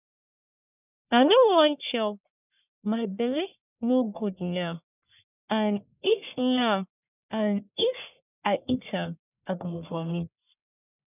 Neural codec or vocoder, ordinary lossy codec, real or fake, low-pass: codec, 44.1 kHz, 1.7 kbps, Pupu-Codec; none; fake; 3.6 kHz